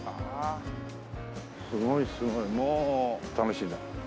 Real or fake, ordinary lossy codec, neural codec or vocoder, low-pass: real; none; none; none